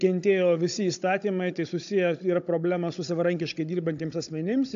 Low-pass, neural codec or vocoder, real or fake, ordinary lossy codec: 7.2 kHz; codec, 16 kHz, 16 kbps, FunCodec, trained on Chinese and English, 50 frames a second; fake; AAC, 64 kbps